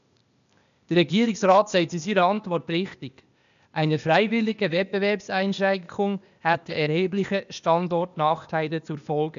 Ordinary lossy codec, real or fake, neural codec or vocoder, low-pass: none; fake; codec, 16 kHz, 0.8 kbps, ZipCodec; 7.2 kHz